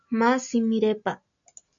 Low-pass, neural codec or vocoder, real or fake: 7.2 kHz; none; real